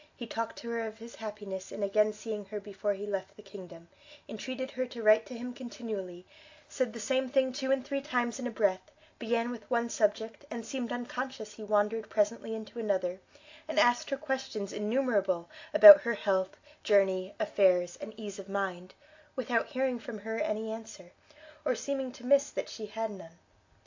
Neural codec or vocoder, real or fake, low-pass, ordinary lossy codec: none; real; 7.2 kHz; AAC, 48 kbps